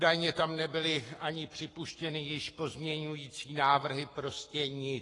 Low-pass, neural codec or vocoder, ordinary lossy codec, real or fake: 10.8 kHz; codec, 44.1 kHz, 7.8 kbps, Pupu-Codec; AAC, 32 kbps; fake